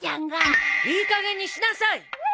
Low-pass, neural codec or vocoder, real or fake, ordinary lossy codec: none; none; real; none